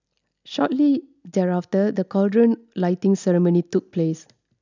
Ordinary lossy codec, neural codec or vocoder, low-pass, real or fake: none; none; 7.2 kHz; real